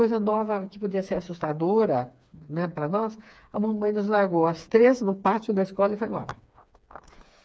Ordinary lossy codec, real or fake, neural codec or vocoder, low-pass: none; fake; codec, 16 kHz, 4 kbps, FreqCodec, smaller model; none